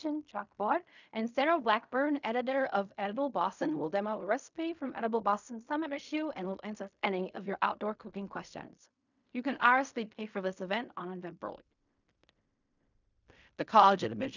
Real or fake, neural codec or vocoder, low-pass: fake; codec, 16 kHz in and 24 kHz out, 0.4 kbps, LongCat-Audio-Codec, fine tuned four codebook decoder; 7.2 kHz